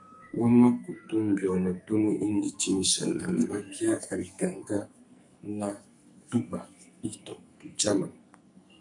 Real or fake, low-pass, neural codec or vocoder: fake; 10.8 kHz; codec, 44.1 kHz, 2.6 kbps, SNAC